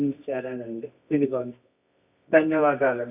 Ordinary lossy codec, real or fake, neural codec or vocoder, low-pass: none; fake; codec, 24 kHz, 0.9 kbps, WavTokenizer, medium music audio release; 3.6 kHz